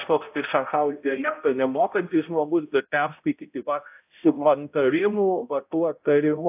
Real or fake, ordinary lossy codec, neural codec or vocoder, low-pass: fake; AAC, 32 kbps; codec, 16 kHz, 0.5 kbps, X-Codec, HuBERT features, trained on balanced general audio; 3.6 kHz